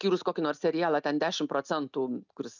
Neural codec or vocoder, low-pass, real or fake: none; 7.2 kHz; real